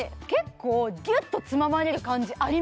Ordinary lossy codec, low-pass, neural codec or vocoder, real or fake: none; none; none; real